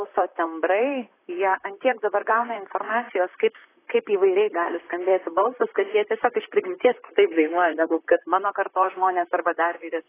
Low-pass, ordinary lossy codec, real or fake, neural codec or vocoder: 3.6 kHz; AAC, 16 kbps; fake; vocoder, 44.1 kHz, 128 mel bands, Pupu-Vocoder